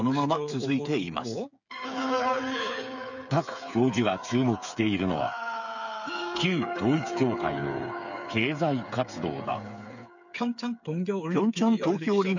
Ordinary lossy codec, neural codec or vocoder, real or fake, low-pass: none; codec, 16 kHz, 8 kbps, FreqCodec, smaller model; fake; 7.2 kHz